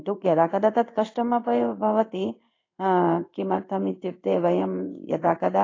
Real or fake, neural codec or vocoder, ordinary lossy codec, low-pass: fake; codec, 16 kHz in and 24 kHz out, 1 kbps, XY-Tokenizer; AAC, 32 kbps; 7.2 kHz